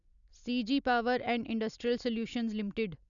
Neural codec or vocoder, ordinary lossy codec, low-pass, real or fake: none; none; 7.2 kHz; real